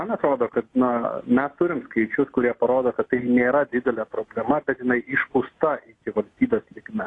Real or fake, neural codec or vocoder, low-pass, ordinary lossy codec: real; none; 10.8 kHz; AAC, 32 kbps